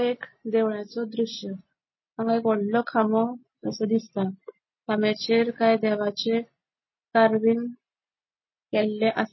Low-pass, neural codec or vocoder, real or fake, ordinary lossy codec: 7.2 kHz; none; real; MP3, 24 kbps